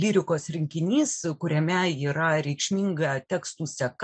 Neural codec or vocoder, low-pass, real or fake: none; 9.9 kHz; real